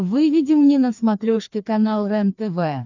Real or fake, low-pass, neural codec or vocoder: fake; 7.2 kHz; codec, 16 kHz, 2 kbps, FreqCodec, larger model